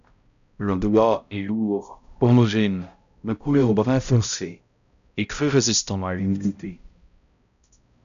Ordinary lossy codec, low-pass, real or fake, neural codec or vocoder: MP3, 96 kbps; 7.2 kHz; fake; codec, 16 kHz, 0.5 kbps, X-Codec, HuBERT features, trained on balanced general audio